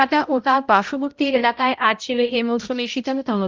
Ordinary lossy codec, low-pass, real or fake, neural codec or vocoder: Opus, 32 kbps; 7.2 kHz; fake; codec, 16 kHz, 0.5 kbps, X-Codec, HuBERT features, trained on balanced general audio